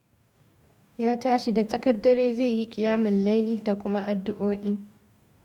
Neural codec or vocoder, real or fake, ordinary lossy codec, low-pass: codec, 44.1 kHz, 2.6 kbps, DAC; fake; none; 19.8 kHz